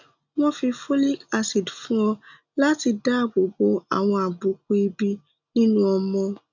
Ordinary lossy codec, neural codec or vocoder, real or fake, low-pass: none; none; real; 7.2 kHz